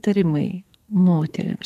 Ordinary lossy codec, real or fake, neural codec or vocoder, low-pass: MP3, 96 kbps; fake; codec, 44.1 kHz, 2.6 kbps, SNAC; 14.4 kHz